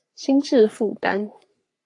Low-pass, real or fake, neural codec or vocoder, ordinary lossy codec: 10.8 kHz; fake; codec, 44.1 kHz, 3.4 kbps, Pupu-Codec; AAC, 48 kbps